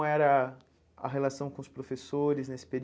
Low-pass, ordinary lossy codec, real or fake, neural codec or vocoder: none; none; real; none